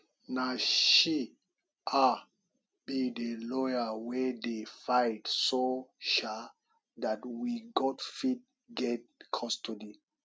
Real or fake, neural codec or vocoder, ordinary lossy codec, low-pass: real; none; none; none